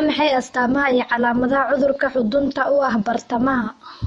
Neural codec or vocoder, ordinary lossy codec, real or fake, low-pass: vocoder, 48 kHz, 128 mel bands, Vocos; MP3, 48 kbps; fake; 19.8 kHz